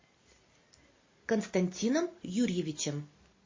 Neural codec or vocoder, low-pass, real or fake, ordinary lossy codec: none; 7.2 kHz; real; MP3, 32 kbps